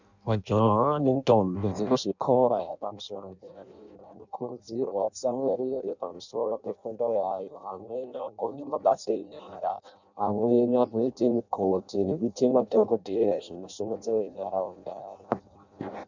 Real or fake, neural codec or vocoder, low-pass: fake; codec, 16 kHz in and 24 kHz out, 0.6 kbps, FireRedTTS-2 codec; 7.2 kHz